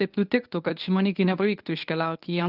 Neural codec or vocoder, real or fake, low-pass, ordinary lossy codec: codec, 24 kHz, 0.9 kbps, WavTokenizer, medium speech release version 1; fake; 5.4 kHz; Opus, 24 kbps